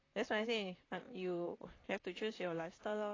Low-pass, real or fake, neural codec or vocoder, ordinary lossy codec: 7.2 kHz; fake; vocoder, 44.1 kHz, 128 mel bands, Pupu-Vocoder; AAC, 32 kbps